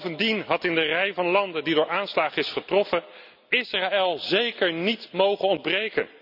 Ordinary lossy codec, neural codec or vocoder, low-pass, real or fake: none; none; 5.4 kHz; real